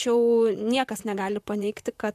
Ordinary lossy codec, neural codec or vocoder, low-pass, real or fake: AAC, 96 kbps; vocoder, 44.1 kHz, 128 mel bands, Pupu-Vocoder; 14.4 kHz; fake